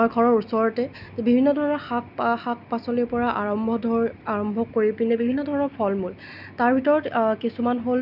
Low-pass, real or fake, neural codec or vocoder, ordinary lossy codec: 5.4 kHz; real; none; none